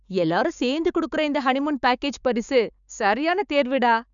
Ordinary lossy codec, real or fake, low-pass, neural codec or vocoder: none; fake; 7.2 kHz; codec, 16 kHz, 6 kbps, DAC